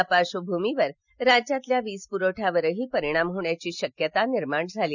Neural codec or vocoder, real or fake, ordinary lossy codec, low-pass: none; real; none; 7.2 kHz